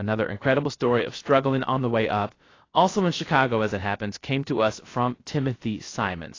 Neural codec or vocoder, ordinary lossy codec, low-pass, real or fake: codec, 16 kHz, 0.3 kbps, FocalCodec; AAC, 32 kbps; 7.2 kHz; fake